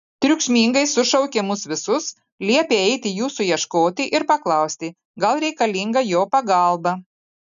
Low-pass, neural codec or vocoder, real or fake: 7.2 kHz; none; real